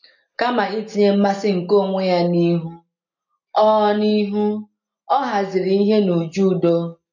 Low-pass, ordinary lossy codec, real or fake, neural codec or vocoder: 7.2 kHz; MP3, 48 kbps; real; none